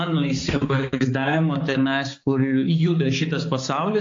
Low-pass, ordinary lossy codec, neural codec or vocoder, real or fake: 7.2 kHz; AAC, 48 kbps; codec, 16 kHz, 4 kbps, X-Codec, HuBERT features, trained on balanced general audio; fake